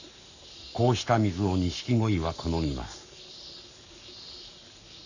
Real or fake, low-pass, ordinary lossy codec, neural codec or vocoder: fake; 7.2 kHz; none; codec, 44.1 kHz, 7.8 kbps, Pupu-Codec